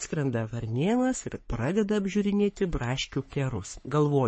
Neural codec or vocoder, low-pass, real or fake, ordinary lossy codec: codec, 44.1 kHz, 3.4 kbps, Pupu-Codec; 10.8 kHz; fake; MP3, 32 kbps